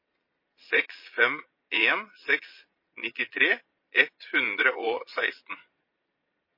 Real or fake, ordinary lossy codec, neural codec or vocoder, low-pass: fake; MP3, 24 kbps; vocoder, 22.05 kHz, 80 mel bands, Vocos; 5.4 kHz